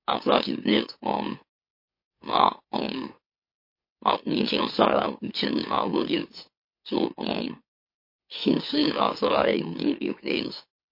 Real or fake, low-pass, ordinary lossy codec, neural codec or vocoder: fake; 5.4 kHz; MP3, 32 kbps; autoencoder, 44.1 kHz, a latent of 192 numbers a frame, MeloTTS